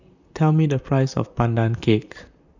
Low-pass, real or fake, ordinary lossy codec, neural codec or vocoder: 7.2 kHz; fake; none; vocoder, 44.1 kHz, 128 mel bands, Pupu-Vocoder